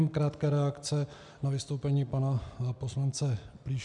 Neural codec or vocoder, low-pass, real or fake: none; 10.8 kHz; real